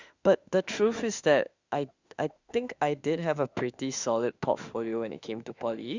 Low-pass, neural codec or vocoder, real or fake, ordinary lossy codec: 7.2 kHz; autoencoder, 48 kHz, 32 numbers a frame, DAC-VAE, trained on Japanese speech; fake; Opus, 64 kbps